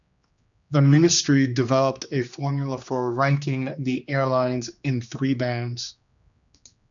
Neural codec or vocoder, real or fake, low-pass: codec, 16 kHz, 2 kbps, X-Codec, HuBERT features, trained on general audio; fake; 7.2 kHz